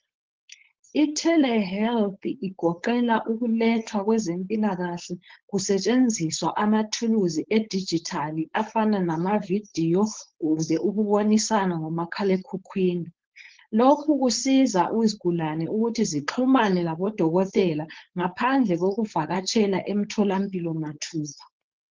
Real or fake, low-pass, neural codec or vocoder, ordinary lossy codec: fake; 7.2 kHz; codec, 16 kHz, 4.8 kbps, FACodec; Opus, 16 kbps